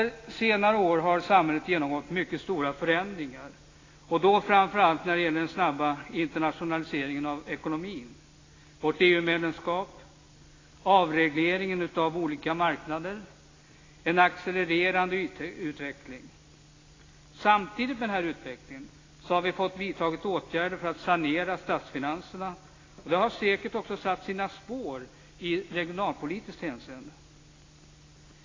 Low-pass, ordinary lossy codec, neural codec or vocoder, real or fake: 7.2 kHz; AAC, 32 kbps; none; real